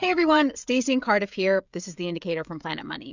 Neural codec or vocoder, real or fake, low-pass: codec, 16 kHz, 16 kbps, FreqCodec, smaller model; fake; 7.2 kHz